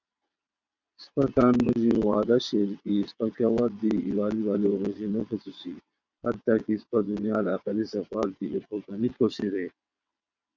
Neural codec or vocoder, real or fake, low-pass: vocoder, 22.05 kHz, 80 mel bands, WaveNeXt; fake; 7.2 kHz